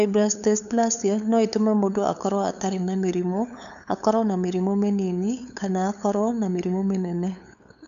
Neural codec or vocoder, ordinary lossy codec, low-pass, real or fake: codec, 16 kHz, 8 kbps, FunCodec, trained on LibriTTS, 25 frames a second; none; 7.2 kHz; fake